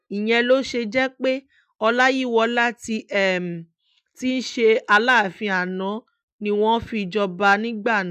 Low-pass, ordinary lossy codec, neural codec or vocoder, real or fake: 14.4 kHz; none; none; real